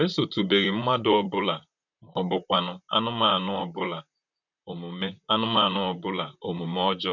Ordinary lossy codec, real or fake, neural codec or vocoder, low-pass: none; fake; vocoder, 44.1 kHz, 128 mel bands, Pupu-Vocoder; 7.2 kHz